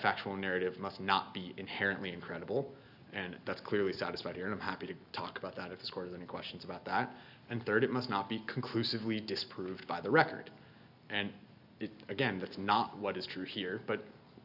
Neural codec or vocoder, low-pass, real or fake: none; 5.4 kHz; real